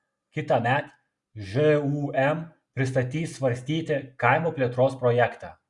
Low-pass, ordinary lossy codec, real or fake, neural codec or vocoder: 10.8 kHz; Opus, 64 kbps; real; none